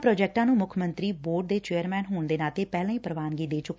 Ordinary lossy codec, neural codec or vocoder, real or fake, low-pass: none; none; real; none